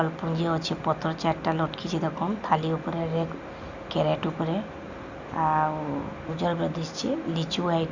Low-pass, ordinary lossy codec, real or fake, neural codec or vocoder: 7.2 kHz; Opus, 64 kbps; real; none